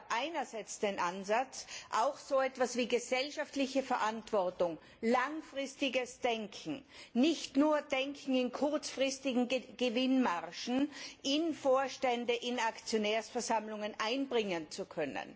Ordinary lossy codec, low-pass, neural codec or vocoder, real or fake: none; none; none; real